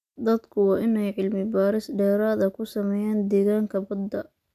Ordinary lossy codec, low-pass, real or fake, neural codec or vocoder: none; 14.4 kHz; real; none